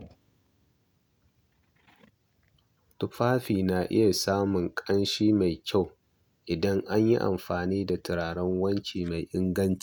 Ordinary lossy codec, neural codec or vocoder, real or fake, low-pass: none; none; real; none